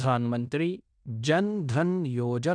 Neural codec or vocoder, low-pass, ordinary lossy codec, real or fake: codec, 16 kHz in and 24 kHz out, 0.9 kbps, LongCat-Audio-Codec, fine tuned four codebook decoder; 9.9 kHz; none; fake